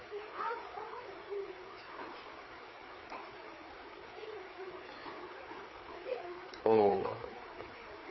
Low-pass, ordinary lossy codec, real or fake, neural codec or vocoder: 7.2 kHz; MP3, 24 kbps; fake; codec, 16 kHz, 4 kbps, FreqCodec, larger model